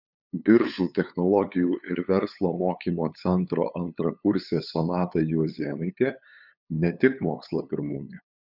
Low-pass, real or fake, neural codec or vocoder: 5.4 kHz; fake; codec, 16 kHz, 8 kbps, FunCodec, trained on LibriTTS, 25 frames a second